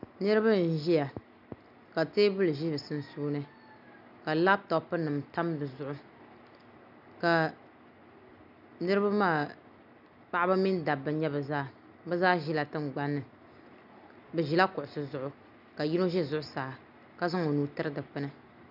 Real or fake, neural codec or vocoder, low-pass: real; none; 5.4 kHz